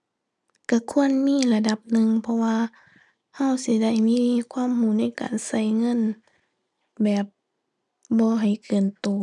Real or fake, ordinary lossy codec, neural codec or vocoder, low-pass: real; none; none; 10.8 kHz